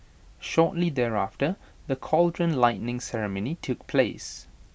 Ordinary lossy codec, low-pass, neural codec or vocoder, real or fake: none; none; none; real